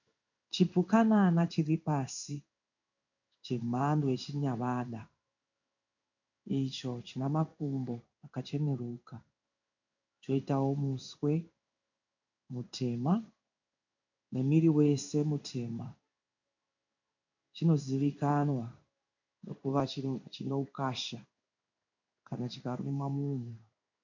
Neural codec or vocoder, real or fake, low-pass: codec, 16 kHz in and 24 kHz out, 1 kbps, XY-Tokenizer; fake; 7.2 kHz